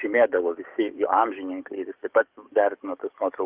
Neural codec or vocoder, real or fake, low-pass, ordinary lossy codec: codec, 44.1 kHz, 7.8 kbps, DAC; fake; 3.6 kHz; Opus, 32 kbps